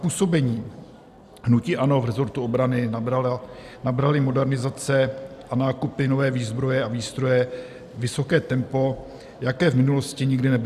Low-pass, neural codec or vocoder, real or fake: 14.4 kHz; none; real